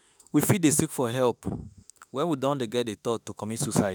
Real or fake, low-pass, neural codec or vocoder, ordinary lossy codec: fake; none; autoencoder, 48 kHz, 32 numbers a frame, DAC-VAE, trained on Japanese speech; none